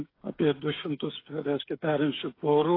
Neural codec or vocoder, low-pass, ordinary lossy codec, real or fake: codec, 16 kHz, 8 kbps, FreqCodec, smaller model; 5.4 kHz; AAC, 24 kbps; fake